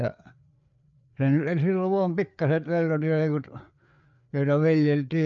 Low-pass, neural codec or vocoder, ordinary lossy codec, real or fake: 7.2 kHz; codec, 16 kHz, 4 kbps, FreqCodec, larger model; none; fake